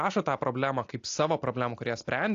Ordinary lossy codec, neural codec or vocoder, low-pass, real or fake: AAC, 48 kbps; none; 7.2 kHz; real